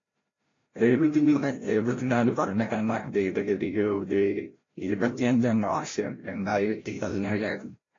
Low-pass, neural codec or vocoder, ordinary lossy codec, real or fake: 7.2 kHz; codec, 16 kHz, 0.5 kbps, FreqCodec, larger model; AAC, 32 kbps; fake